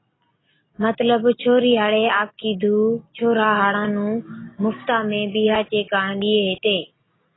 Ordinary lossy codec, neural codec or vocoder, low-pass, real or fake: AAC, 16 kbps; none; 7.2 kHz; real